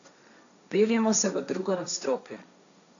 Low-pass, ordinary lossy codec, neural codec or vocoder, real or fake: 7.2 kHz; none; codec, 16 kHz, 1.1 kbps, Voila-Tokenizer; fake